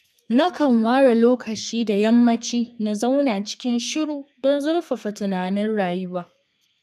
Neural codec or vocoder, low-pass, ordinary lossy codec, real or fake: codec, 32 kHz, 1.9 kbps, SNAC; 14.4 kHz; none; fake